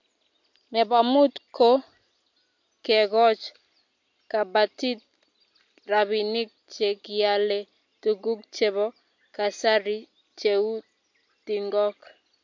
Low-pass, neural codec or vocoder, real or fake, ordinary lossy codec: 7.2 kHz; none; real; MP3, 48 kbps